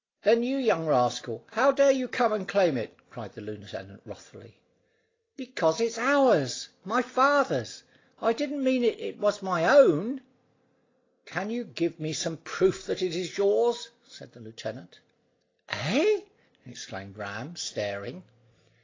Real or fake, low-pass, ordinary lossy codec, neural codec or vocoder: real; 7.2 kHz; AAC, 32 kbps; none